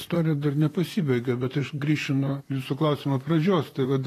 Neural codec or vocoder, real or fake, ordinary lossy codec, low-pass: vocoder, 44.1 kHz, 128 mel bands, Pupu-Vocoder; fake; AAC, 48 kbps; 14.4 kHz